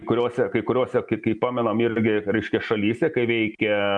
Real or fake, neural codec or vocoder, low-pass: real; none; 9.9 kHz